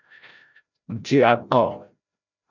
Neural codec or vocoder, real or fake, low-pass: codec, 16 kHz, 0.5 kbps, FreqCodec, larger model; fake; 7.2 kHz